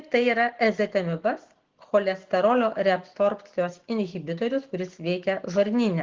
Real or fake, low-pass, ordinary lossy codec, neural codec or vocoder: real; 7.2 kHz; Opus, 16 kbps; none